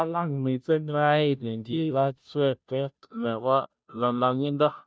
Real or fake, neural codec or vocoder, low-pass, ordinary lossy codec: fake; codec, 16 kHz, 0.5 kbps, FunCodec, trained on Chinese and English, 25 frames a second; none; none